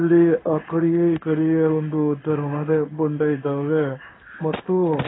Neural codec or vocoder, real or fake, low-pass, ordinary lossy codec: codec, 16 kHz in and 24 kHz out, 1 kbps, XY-Tokenizer; fake; 7.2 kHz; AAC, 16 kbps